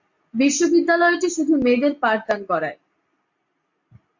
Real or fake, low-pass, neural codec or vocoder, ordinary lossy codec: real; 7.2 kHz; none; MP3, 48 kbps